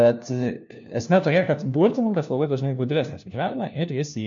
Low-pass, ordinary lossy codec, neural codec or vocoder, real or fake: 7.2 kHz; MP3, 48 kbps; codec, 16 kHz, 1 kbps, FunCodec, trained on LibriTTS, 50 frames a second; fake